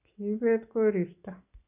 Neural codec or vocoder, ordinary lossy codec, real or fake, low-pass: none; none; real; 3.6 kHz